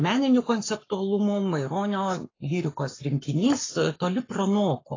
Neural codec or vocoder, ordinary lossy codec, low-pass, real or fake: codec, 44.1 kHz, 7.8 kbps, Pupu-Codec; AAC, 32 kbps; 7.2 kHz; fake